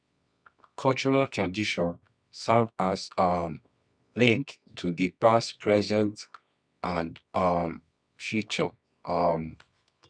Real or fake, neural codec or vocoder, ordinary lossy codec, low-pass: fake; codec, 24 kHz, 0.9 kbps, WavTokenizer, medium music audio release; none; 9.9 kHz